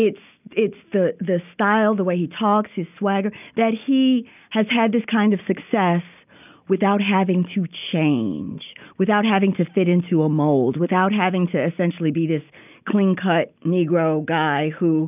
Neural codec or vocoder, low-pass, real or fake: none; 3.6 kHz; real